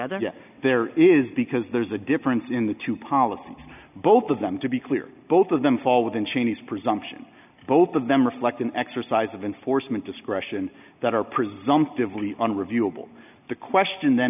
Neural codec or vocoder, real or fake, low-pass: none; real; 3.6 kHz